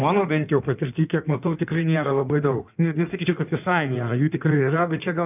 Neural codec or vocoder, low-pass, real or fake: codec, 16 kHz in and 24 kHz out, 1.1 kbps, FireRedTTS-2 codec; 3.6 kHz; fake